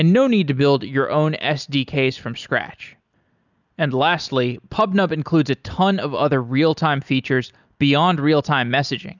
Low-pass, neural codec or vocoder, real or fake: 7.2 kHz; none; real